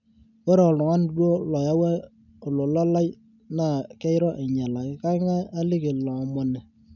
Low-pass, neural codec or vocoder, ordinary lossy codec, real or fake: 7.2 kHz; none; none; real